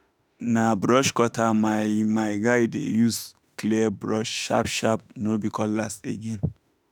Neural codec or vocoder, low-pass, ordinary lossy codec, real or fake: autoencoder, 48 kHz, 32 numbers a frame, DAC-VAE, trained on Japanese speech; none; none; fake